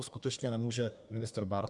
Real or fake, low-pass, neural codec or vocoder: fake; 10.8 kHz; codec, 24 kHz, 1 kbps, SNAC